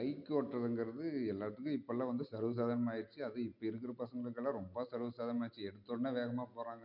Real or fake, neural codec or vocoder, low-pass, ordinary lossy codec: real; none; 5.4 kHz; none